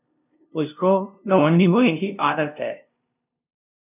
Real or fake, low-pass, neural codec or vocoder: fake; 3.6 kHz; codec, 16 kHz, 0.5 kbps, FunCodec, trained on LibriTTS, 25 frames a second